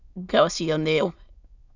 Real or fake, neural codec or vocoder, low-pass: fake; autoencoder, 22.05 kHz, a latent of 192 numbers a frame, VITS, trained on many speakers; 7.2 kHz